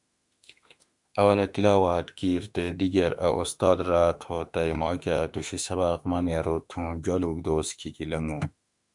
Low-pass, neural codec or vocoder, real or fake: 10.8 kHz; autoencoder, 48 kHz, 32 numbers a frame, DAC-VAE, trained on Japanese speech; fake